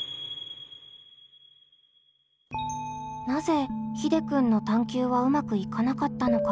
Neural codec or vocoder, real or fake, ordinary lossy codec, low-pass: none; real; none; none